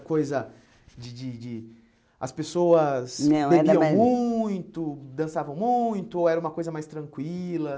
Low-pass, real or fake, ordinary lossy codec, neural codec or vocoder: none; real; none; none